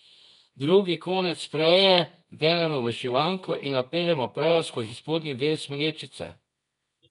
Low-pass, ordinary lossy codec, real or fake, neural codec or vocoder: 10.8 kHz; none; fake; codec, 24 kHz, 0.9 kbps, WavTokenizer, medium music audio release